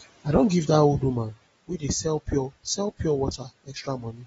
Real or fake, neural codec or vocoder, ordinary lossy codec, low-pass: real; none; AAC, 24 kbps; 19.8 kHz